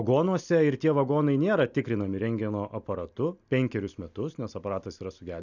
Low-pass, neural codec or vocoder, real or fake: 7.2 kHz; none; real